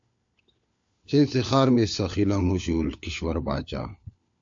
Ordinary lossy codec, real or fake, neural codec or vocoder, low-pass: AAC, 64 kbps; fake; codec, 16 kHz, 4 kbps, FunCodec, trained on LibriTTS, 50 frames a second; 7.2 kHz